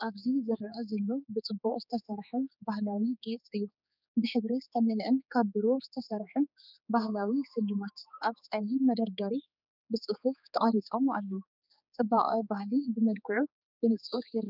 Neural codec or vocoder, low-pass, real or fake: codec, 16 kHz, 4 kbps, X-Codec, HuBERT features, trained on general audio; 5.4 kHz; fake